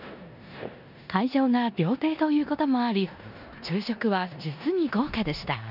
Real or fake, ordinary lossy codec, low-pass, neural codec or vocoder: fake; none; 5.4 kHz; codec, 16 kHz in and 24 kHz out, 0.9 kbps, LongCat-Audio-Codec, four codebook decoder